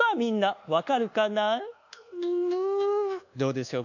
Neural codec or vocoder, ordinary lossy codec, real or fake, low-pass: codec, 24 kHz, 1.2 kbps, DualCodec; none; fake; 7.2 kHz